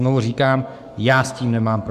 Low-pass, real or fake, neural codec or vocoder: 14.4 kHz; fake; codec, 44.1 kHz, 7.8 kbps, Pupu-Codec